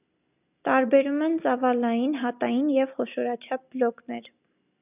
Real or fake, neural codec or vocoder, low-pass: real; none; 3.6 kHz